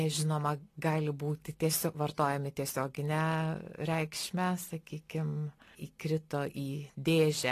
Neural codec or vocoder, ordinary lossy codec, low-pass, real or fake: none; AAC, 48 kbps; 14.4 kHz; real